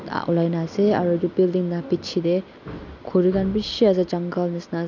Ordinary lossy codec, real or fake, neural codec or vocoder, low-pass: none; real; none; 7.2 kHz